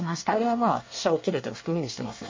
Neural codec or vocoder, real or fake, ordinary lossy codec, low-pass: codec, 24 kHz, 1 kbps, SNAC; fake; MP3, 32 kbps; 7.2 kHz